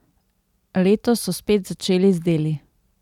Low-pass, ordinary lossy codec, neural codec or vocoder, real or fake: 19.8 kHz; none; none; real